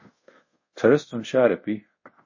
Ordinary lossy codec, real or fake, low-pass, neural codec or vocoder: MP3, 32 kbps; fake; 7.2 kHz; codec, 24 kHz, 0.5 kbps, DualCodec